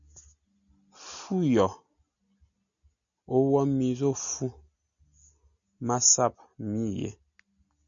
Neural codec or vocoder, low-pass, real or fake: none; 7.2 kHz; real